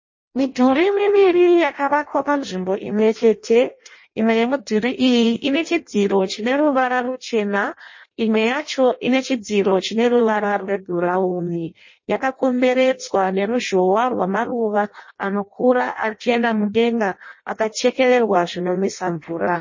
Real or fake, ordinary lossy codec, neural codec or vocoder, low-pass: fake; MP3, 32 kbps; codec, 16 kHz in and 24 kHz out, 0.6 kbps, FireRedTTS-2 codec; 7.2 kHz